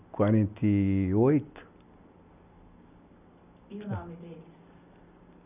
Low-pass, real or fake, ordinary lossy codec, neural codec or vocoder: 3.6 kHz; real; none; none